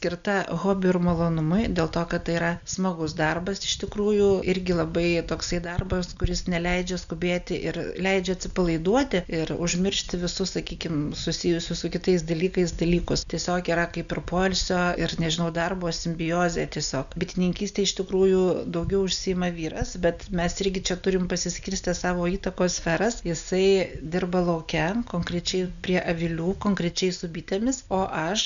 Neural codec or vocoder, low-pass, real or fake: none; 7.2 kHz; real